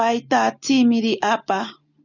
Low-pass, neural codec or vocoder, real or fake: 7.2 kHz; none; real